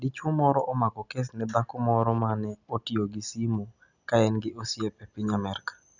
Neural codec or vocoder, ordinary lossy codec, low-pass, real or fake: none; none; 7.2 kHz; real